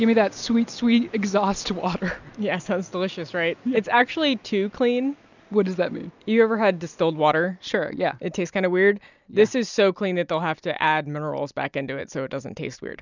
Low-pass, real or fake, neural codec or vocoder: 7.2 kHz; real; none